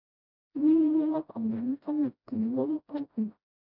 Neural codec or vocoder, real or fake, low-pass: codec, 16 kHz, 0.5 kbps, FreqCodec, smaller model; fake; 5.4 kHz